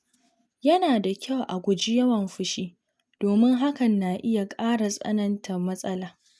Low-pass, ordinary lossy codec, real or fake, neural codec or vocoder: none; none; real; none